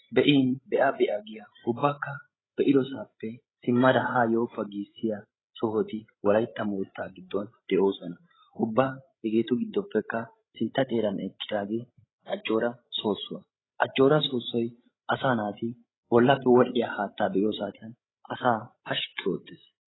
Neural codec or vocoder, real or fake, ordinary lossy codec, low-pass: codec, 16 kHz, 16 kbps, FreqCodec, larger model; fake; AAC, 16 kbps; 7.2 kHz